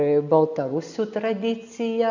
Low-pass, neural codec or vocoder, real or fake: 7.2 kHz; none; real